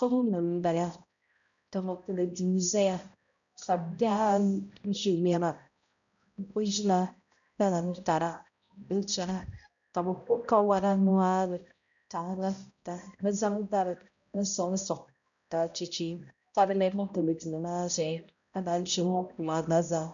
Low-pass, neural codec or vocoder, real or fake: 7.2 kHz; codec, 16 kHz, 0.5 kbps, X-Codec, HuBERT features, trained on balanced general audio; fake